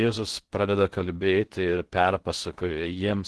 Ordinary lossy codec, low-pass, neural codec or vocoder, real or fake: Opus, 16 kbps; 10.8 kHz; codec, 16 kHz in and 24 kHz out, 0.6 kbps, FocalCodec, streaming, 2048 codes; fake